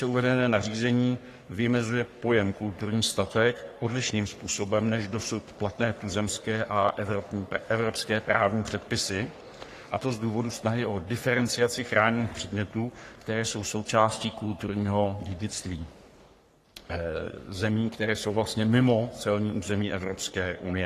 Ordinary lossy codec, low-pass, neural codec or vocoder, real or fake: AAC, 48 kbps; 14.4 kHz; codec, 44.1 kHz, 3.4 kbps, Pupu-Codec; fake